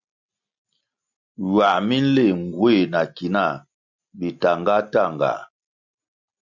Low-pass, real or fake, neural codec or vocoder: 7.2 kHz; real; none